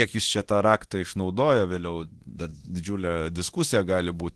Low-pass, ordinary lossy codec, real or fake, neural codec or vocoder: 10.8 kHz; Opus, 16 kbps; fake; codec, 24 kHz, 0.9 kbps, DualCodec